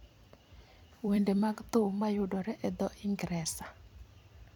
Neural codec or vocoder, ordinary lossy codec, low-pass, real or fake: vocoder, 44.1 kHz, 128 mel bands every 256 samples, BigVGAN v2; none; 19.8 kHz; fake